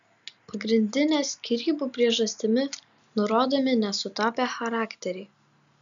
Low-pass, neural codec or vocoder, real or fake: 7.2 kHz; none; real